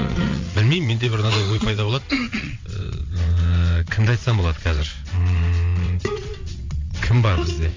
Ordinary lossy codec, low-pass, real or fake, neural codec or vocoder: MP3, 48 kbps; 7.2 kHz; fake; vocoder, 22.05 kHz, 80 mel bands, WaveNeXt